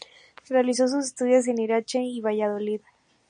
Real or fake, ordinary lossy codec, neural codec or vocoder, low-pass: real; MP3, 96 kbps; none; 9.9 kHz